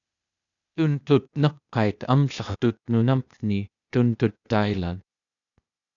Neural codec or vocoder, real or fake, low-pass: codec, 16 kHz, 0.8 kbps, ZipCodec; fake; 7.2 kHz